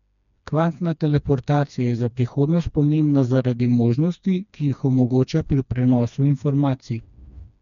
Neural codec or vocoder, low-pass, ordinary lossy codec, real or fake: codec, 16 kHz, 2 kbps, FreqCodec, smaller model; 7.2 kHz; none; fake